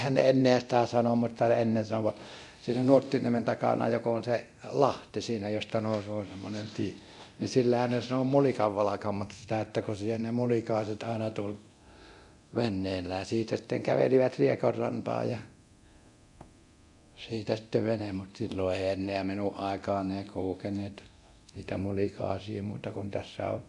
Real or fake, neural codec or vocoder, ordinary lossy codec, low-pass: fake; codec, 24 kHz, 0.9 kbps, DualCodec; none; 10.8 kHz